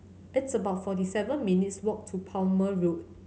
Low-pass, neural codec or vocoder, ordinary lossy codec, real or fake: none; none; none; real